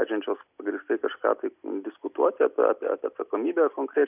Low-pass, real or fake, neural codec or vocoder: 3.6 kHz; real; none